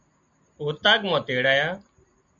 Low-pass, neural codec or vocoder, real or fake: 7.2 kHz; none; real